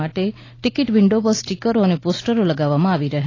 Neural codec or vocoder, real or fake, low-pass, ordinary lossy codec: none; real; 7.2 kHz; AAC, 32 kbps